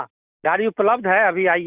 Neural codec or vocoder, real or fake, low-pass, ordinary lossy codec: none; real; 3.6 kHz; Opus, 64 kbps